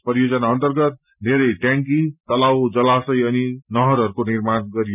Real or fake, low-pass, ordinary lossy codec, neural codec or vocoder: real; 3.6 kHz; none; none